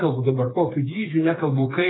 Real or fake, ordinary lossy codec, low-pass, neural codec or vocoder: fake; AAC, 16 kbps; 7.2 kHz; codec, 16 kHz, 4 kbps, FreqCodec, smaller model